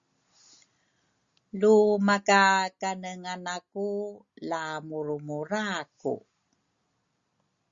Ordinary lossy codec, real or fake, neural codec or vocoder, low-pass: Opus, 64 kbps; real; none; 7.2 kHz